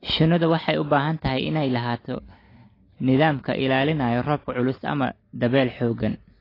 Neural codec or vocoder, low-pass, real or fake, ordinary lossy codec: none; 5.4 kHz; real; AAC, 24 kbps